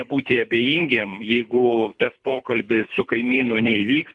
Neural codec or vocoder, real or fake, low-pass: codec, 24 kHz, 3 kbps, HILCodec; fake; 10.8 kHz